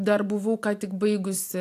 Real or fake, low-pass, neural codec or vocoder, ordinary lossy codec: real; 14.4 kHz; none; AAC, 64 kbps